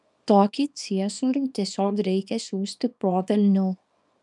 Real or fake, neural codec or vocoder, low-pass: fake; codec, 24 kHz, 0.9 kbps, WavTokenizer, small release; 10.8 kHz